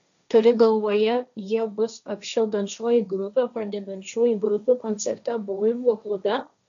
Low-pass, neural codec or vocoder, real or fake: 7.2 kHz; codec, 16 kHz, 1.1 kbps, Voila-Tokenizer; fake